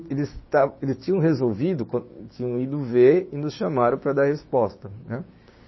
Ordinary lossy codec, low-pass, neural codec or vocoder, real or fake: MP3, 24 kbps; 7.2 kHz; codec, 16 kHz, 6 kbps, DAC; fake